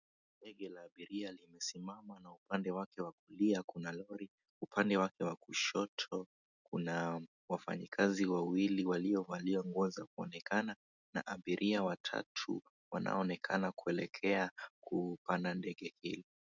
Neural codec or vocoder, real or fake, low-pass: none; real; 7.2 kHz